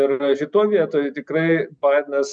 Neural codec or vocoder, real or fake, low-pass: none; real; 9.9 kHz